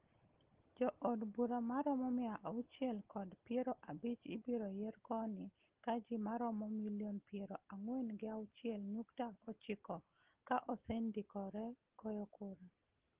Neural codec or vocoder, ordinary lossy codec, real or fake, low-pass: none; Opus, 16 kbps; real; 3.6 kHz